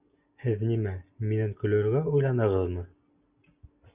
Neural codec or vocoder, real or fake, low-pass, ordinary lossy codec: none; real; 3.6 kHz; Opus, 64 kbps